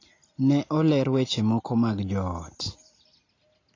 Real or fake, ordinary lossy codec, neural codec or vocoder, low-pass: real; AAC, 32 kbps; none; 7.2 kHz